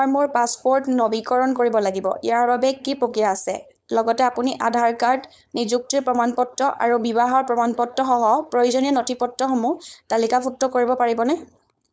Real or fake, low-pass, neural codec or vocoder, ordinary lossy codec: fake; none; codec, 16 kHz, 4.8 kbps, FACodec; none